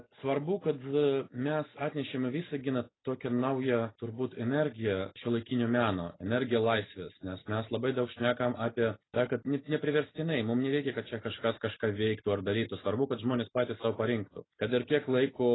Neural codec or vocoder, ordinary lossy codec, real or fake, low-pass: none; AAC, 16 kbps; real; 7.2 kHz